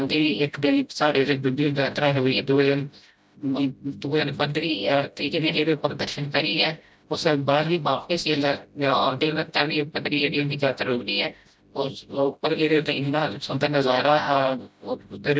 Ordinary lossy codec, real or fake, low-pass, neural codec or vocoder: none; fake; none; codec, 16 kHz, 0.5 kbps, FreqCodec, smaller model